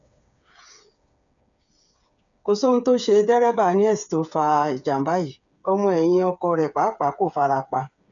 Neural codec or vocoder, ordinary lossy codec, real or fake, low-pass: codec, 16 kHz, 8 kbps, FreqCodec, smaller model; none; fake; 7.2 kHz